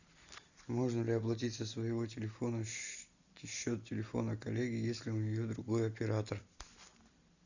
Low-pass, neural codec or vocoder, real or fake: 7.2 kHz; none; real